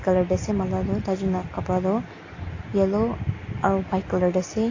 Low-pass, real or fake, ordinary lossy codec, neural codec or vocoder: 7.2 kHz; real; AAC, 32 kbps; none